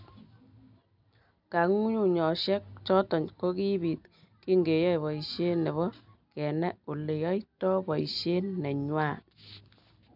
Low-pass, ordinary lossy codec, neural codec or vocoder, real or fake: 5.4 kHz; none; none; real